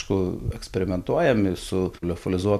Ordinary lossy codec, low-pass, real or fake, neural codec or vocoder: AAC, 96 kbps; 14.4 kHz; real; none